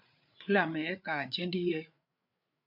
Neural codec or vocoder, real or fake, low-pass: codec, 16 kHz, 8 kbps, FreqCodec, larger model; fake; 5.4 kHz